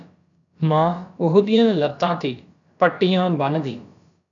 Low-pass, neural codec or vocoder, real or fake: 7.2 kHz; codec, 16 kHz, about 1 kbps, DyCAST, with the encoder's durations; fake